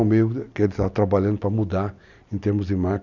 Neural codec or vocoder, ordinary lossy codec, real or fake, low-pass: none; none; real; 7.2 kHz